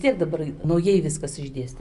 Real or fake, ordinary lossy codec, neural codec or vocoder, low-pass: real; Opus, 64 kbps; none; 10.8 kHz